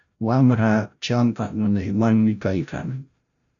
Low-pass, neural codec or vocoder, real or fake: 7.2 kHz; codec, 16 kHz, 0.5 kbps, FreqCodec, larger model; fake